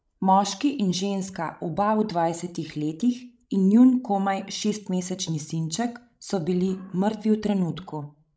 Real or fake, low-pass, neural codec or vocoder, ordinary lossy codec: fake; none; codec, 16 kHz, 16 kbps, FreqCodec, larger model; none